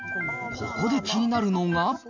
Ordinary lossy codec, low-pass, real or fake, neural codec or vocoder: none; 7.2 kHz; real; none